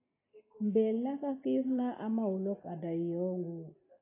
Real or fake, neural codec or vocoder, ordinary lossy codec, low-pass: real; none; AAC, 16 kbps; 3.6 kHz